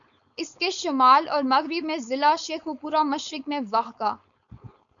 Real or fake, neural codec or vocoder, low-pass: fake; codec, 16 kHz, 4.8 kbps, FACodec; 7.2 kHz